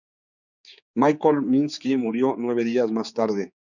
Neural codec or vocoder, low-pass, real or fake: codec, 16 kHz, 4 kbps, X-Codec, HuBERT features, trained on general audio; 7.2 kHz; fake